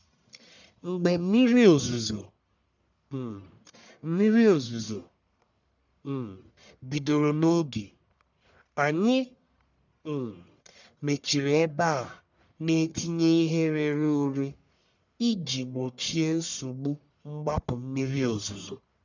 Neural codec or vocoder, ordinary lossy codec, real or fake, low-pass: codec, 44.1 kHz, 1.7 kbps, Pupu-Codec; none; fake; 7.2 kHz